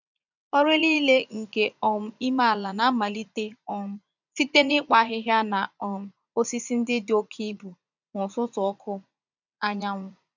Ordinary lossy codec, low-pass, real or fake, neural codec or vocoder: none; 7.2 kHz; fake; vocoder, 44.1 kHz, 80 mel bands, Vocos